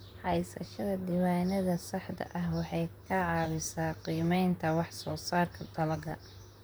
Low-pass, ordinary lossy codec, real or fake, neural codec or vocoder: none; none; fake; vocoder, 44.1 kHz, 128 mel bands, Pupu-Vocoder